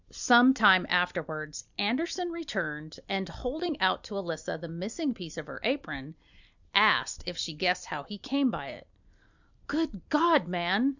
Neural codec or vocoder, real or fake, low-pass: none; real; 7.2 kHz